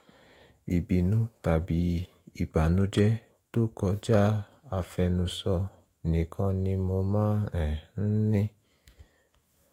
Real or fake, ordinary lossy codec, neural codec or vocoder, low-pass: fake; AAC, 48 kbps; autoencoder, 48 kHz, 128 numbers a frame, DAC-VAE, trained on Japanese speech; 19.8 kHz